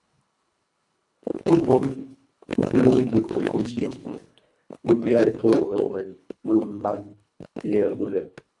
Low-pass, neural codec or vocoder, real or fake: 10.8 kHz; codec, 24 kHz, 1.5 kbps, HILCodec; fake